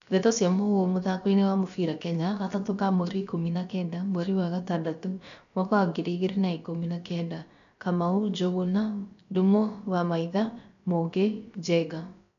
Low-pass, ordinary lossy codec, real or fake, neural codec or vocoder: 7.2 kHz; none; fake; codec, 16 kHz, about 1 kbps, DyCAST, with the encoder's durations